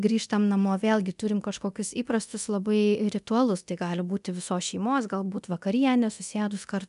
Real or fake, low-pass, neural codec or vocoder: fake; 10.8 kHz; codec, 24 kHz, 0.9 kbps, DualCodec